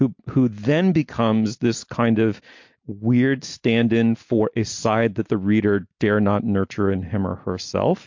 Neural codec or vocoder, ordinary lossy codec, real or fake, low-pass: none; MP3, 48 kbps; real; 7.2 kHz